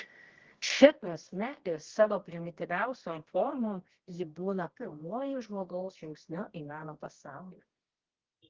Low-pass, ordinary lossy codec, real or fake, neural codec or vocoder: 7.2 kHz; Opus, 16 kbps; fake; codec, 24 kHz, 0.9 kbps, WavTokenizer, medium music audio release